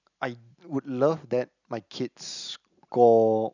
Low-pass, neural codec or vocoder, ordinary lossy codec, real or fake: 7.2 kHz; none; none; real